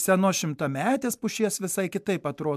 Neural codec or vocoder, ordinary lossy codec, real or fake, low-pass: none; MP3, 96 kbps; real; 14.4 kHz